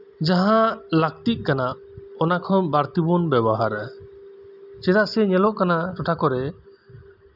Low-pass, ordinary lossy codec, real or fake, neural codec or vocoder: 5.4 kHz; none; real; none